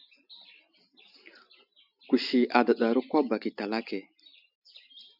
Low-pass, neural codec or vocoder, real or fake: 5.4 kHz; none; real